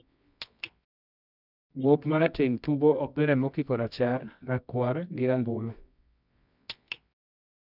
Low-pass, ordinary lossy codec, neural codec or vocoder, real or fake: 5.4 kHz; none; codec, 24 kHz, 0.9 kbps, WavTokenizer, medium music audio release; fake